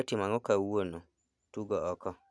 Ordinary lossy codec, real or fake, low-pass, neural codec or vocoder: none; real; none; none